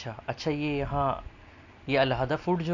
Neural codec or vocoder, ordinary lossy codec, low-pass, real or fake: none; none; 7.2 kHz; real